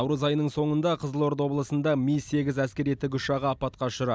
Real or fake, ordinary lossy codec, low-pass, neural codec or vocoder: real; none; none; none